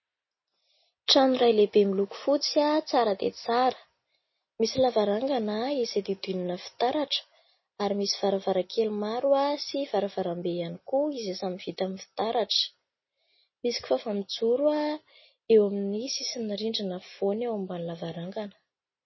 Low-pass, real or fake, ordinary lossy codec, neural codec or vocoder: 7.2 kHz; real; MP3, 24 kbps; none